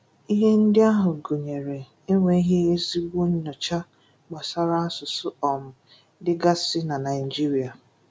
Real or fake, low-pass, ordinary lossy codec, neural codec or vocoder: real; none; none; none